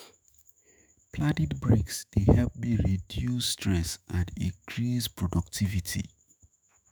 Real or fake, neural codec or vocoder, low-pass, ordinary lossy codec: fake; autoencoder, 48 kHz, 128 numbers a frame, DAC-VAE, trained on Japanese speech; none; none